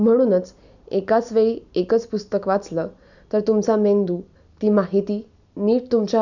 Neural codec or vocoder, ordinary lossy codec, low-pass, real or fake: none; none; 7.2 kHz; real